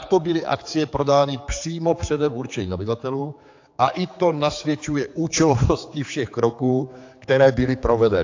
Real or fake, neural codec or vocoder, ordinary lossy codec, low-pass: fake; codec, 16 kHz, 4 kbps, X-Codec, HuBERT features, trained on general audio; AAC, 48 kbps; 7.2 kHz